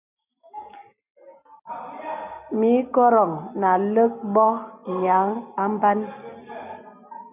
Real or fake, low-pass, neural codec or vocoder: real; 3.6 kHz; none